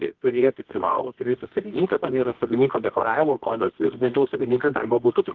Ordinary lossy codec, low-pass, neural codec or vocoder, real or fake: Opus, 16 kbps; 7.2 kHz; codec, 24 kHz, 0.9 kbps, WavTokenizer, medium music audio release; fake